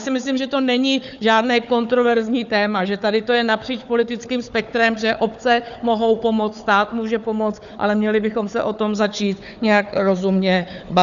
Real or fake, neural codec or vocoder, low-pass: fake; codec, 16 kHz, 4 kbps, FunCodec, trained on Chinese and English, 50 frames a second; 7.2 kHz